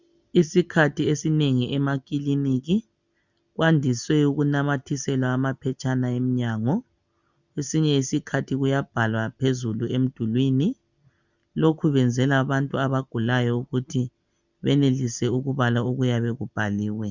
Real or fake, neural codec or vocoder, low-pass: real; none; 7.2 kHz